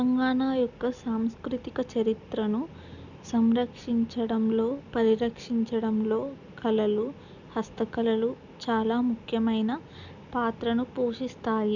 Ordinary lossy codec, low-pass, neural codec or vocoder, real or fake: none; 7.2 kHz; none; real